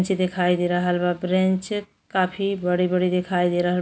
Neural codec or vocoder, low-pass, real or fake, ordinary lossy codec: none; none; real; none